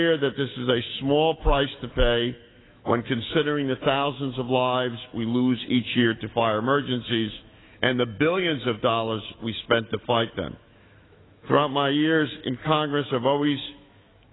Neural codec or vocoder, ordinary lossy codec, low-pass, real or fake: autoencoder, 48 kHz, 128 numbers a frame, DAC-VAE, trained on Japanese speech; AAC, 16 kbps; 7.2 kHz; fake